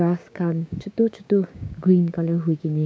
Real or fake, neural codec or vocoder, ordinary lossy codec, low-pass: fake; codec, 16 kHz, 6 kbps, DAC; none; none